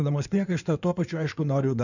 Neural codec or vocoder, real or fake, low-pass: codec, 16 kHz in and 24 kHz out, 2.2 kbps, FireRedTTS-2 codec; fake; 7.2 kHz